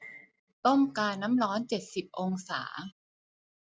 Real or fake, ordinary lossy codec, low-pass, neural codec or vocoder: real; none; none; none